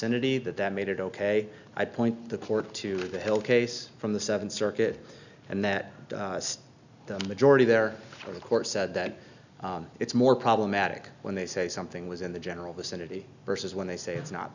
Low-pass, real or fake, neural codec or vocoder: 7.2 kHz; real; none